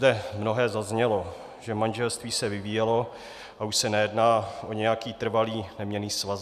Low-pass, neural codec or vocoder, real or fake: 14.4 kHz; none; real